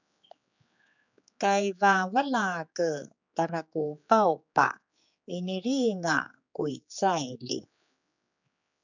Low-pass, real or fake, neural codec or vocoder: 7.2 kHz; fake; codec, 16 kHz, 4 kbps, X-Codec, HuBERT features, trained on general audio